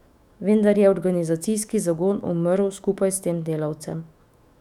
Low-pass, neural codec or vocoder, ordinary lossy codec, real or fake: 19.8 kHz; autoencoder, 48 kHz, 128 numbers a frame, DAC-VAE, trained on Japanese speech; none; fake